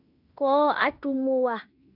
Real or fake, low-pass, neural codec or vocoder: fake; 5.4 kHz; codec, 16 kHz in and 24 kHz out, 0.9 kbps, LongCat-Audio-Codec, fine tuned four codebook decoder